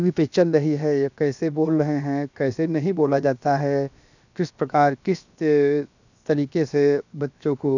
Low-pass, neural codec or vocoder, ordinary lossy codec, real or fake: 7.2 kHz; codec, 16 kHz, 0.7 kbps, FocalCodec; none; fake